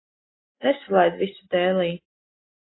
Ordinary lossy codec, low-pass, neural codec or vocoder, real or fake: AAC, 16 kbps; 7.2 kHz; none; real